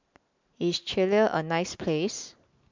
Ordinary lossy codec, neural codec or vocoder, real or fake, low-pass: MP3, 64 kbps; none; real; 7.2 kHz